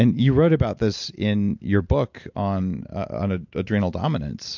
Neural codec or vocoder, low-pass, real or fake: none; 7.2 kHz; real